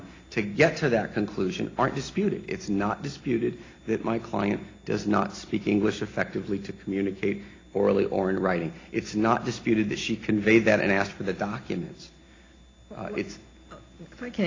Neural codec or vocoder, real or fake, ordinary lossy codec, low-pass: none; real; AAC, 32 kbps; 7.2 kHz